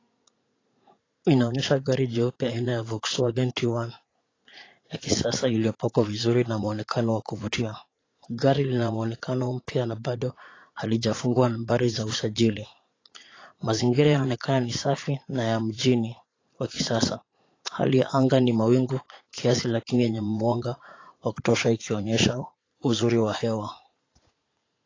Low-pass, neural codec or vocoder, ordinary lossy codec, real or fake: 7.2 kHz; vocoder, 44.1 kHz, 80 mel bands, Vocos; AAC, 32 kbps; fake